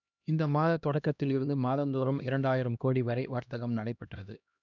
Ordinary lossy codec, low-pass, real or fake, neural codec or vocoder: none; 7.2 kHz; fake; codec, 16 kHz, 1 kbps, X-Codec, HuBERT features, trained on LibriSpeech